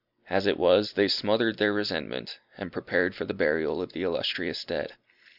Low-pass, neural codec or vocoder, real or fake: 5.4 kHz; none; real